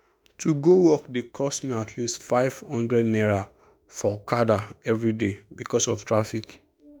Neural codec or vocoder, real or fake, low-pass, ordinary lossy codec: autoencoder, 48 kHz, 32 numbers a frame, DAC-VAE, trained on Japanese speech; fake; none; none